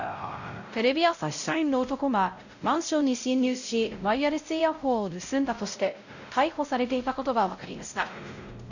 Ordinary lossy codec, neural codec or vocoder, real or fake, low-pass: none; codec, 16 kHz, 0.5 kbps, X-Codec, WavLM features, trained on Multilingual LibriSpeech; fake; 7.2 kHz